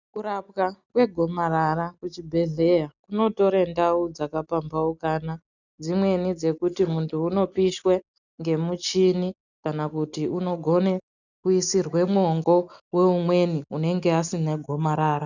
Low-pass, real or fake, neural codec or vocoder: 7.2 kHz; real; none